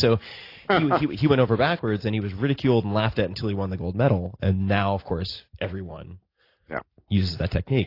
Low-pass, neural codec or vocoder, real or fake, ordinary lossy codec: 5.4 kHz; none; real; AAC, 32 kbps